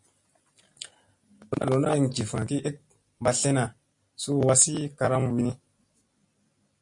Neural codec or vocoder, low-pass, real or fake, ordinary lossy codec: none; 10.8 kHz; real; MP3, 48 kbps